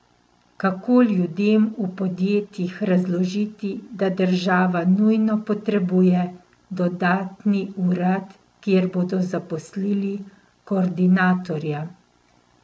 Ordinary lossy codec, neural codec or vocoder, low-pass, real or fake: none; none; none; real